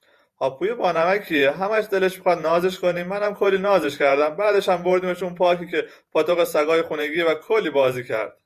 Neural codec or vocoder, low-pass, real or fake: vocoder, 48 kHz, 128 mel bands, Vocos; 14.4 kHz; fake